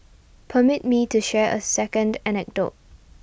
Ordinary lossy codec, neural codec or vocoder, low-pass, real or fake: none; none; none; real